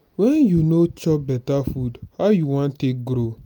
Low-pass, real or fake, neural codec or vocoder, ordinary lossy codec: none; real; none; none